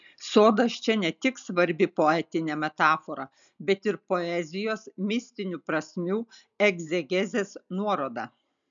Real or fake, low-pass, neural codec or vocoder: real; 7.2 kHz; none